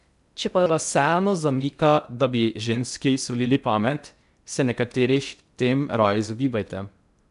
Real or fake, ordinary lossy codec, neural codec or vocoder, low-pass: fake; none; codec, 16 kHz in and 24 kHz out, 0.6 kbps, FocalCodec, streaming, 2048 codes; 10.8 kHz